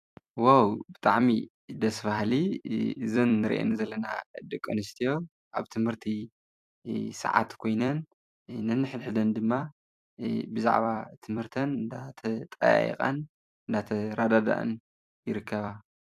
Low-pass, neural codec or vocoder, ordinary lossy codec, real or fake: 14.4 kHz; vocoder, 44.1 kHz, 128 mel bands every 256 samples, BigVGAN v2; AAC, 96 kbps; fake